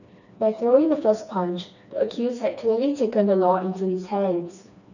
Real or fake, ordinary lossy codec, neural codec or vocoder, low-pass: fake; none; codec, 16 kHz, 2 kbps, FreqCodec, smaller model; 7.2 kHz